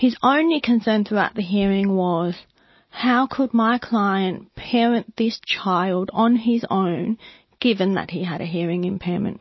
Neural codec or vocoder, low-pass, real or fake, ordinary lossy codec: none; 7.2 kHz; real; MP3, 24 kbps